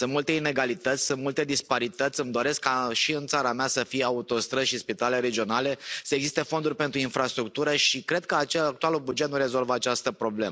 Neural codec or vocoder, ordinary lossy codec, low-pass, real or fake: none; none; none; real